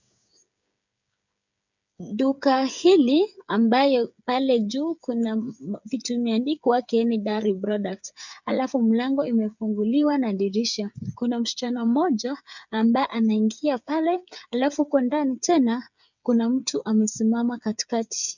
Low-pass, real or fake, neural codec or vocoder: 7.2 kHz; fake; codec, 16 kHz, 8 kbps, FreqCodec, smaller model